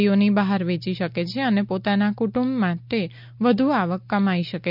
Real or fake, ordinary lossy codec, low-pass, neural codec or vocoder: real; none; 5.4 kHz; none